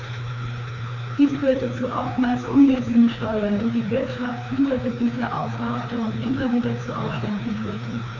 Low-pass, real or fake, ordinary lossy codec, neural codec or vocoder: 7.2 kHz; fake; none; codec, 16 kHz, 2 kbps, FreqCodec, larger model